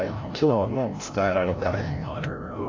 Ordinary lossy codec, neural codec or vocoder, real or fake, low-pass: AAC, 32 kbps; codec, 16 kHz, 0.5 kbps, FreqCodec, larger model; fake; 7.2 kHz